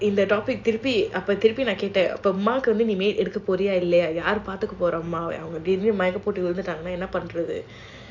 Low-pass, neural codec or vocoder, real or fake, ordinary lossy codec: 7.2 kHz; none; real; AAC, 48 kbps